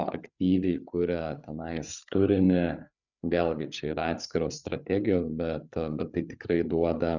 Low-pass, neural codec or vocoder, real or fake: 7.2 kHz; codec, 16 kHz, 8 kbps, FreqCodec, larger model; fake